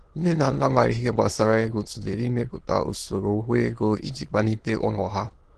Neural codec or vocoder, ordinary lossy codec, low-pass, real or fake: autoencoder, 22.05 kHz, a latent of 192 numbers a frame, VITS, trained on many speakers; Opus, 16 kbps; 9.9 kHz; fake